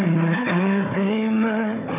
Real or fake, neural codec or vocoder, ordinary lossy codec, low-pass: fake; codec, 16 kHz, 4 kbps, FunCodec, trained on Chinese and English, 50 frames a second; none; 3.6 kHz